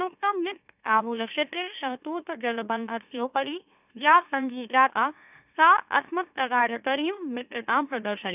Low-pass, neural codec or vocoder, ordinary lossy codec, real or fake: 3.6 kHz; autoencoder, 44.1 kHz, a latent of 192 numbers a frame, MeloTTS; none; fake